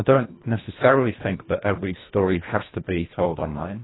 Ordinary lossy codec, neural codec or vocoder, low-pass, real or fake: AAC, 16 kbps; codec, 24 kHz, 1.5 kbps, HILCodec; 7.2 kHz; fake